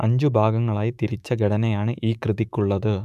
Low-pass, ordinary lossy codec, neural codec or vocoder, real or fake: 14.4 kHz; none; autoencoder, 48 kHz, 128 numbers a frame, DAC-VAE, trained on Japanese speech; fake